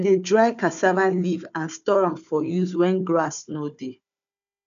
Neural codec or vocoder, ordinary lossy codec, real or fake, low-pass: codec, 16 kHz, 4 kbps, FunCodec, trained on Chinese and English, 50 frames a second; none; fake; 7.2 kHz